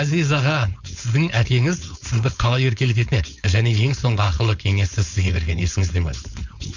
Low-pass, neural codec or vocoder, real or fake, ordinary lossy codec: 7.2 kHz; codec, 16 kHz, 4.8 kbps, FACodec; fake; none